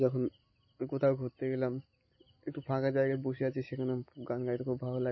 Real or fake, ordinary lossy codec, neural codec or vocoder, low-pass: real; MP3, 24 kbps; none; 7.2 kHz